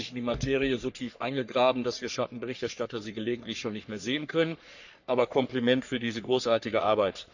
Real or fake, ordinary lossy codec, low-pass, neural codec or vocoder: fake; none; 7.2 kHz; codec, 44.1 kHz, 3.4 kbps, Pupu-Codec